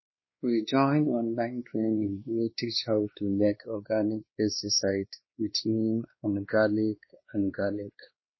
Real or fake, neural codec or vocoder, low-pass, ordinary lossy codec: fake; codec, 16 kHz, 2 kbps, X-Codec, WavLM features, trained on Multilingual LibriSpeech; 7.2 kHz; MP3, 24 kbps